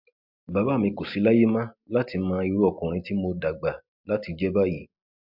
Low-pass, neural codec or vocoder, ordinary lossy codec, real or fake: 5.4 kHz; none; MP3, 48 kbps; real